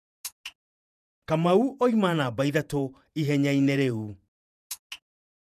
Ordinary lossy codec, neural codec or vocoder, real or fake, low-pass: none; vocoder, 48 kHz, 128 mel bands, Vocos; fake; 14.4 kHz